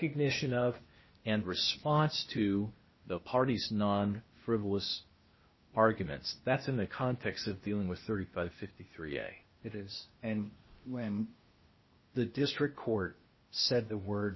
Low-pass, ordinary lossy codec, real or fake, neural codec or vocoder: 7.2 kHz; MP3, 24 kbps; fake; codec, 16 kHz, 0.8 kbps, ZipCodec